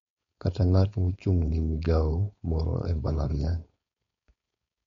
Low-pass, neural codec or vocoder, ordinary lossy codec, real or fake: 7.2 kHz; codec, 16 kHz, 4.8 kbps, FACodec; MP3, 48 kbps; fake